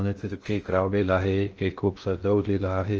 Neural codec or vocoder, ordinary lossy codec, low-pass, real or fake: codec, 16 kHz in and 24 kHz out, 0.6 kbps, FocalCodec, streaming, 2048 codes; Opus, 24 kbps; 7.2 kHz; fake